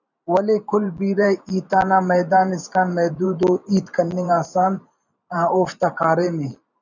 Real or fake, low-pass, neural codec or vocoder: fake; 7.2 kHz; vocoder, 44.1 kHz, 128 mel bands every 512 samples, BigVGAN v2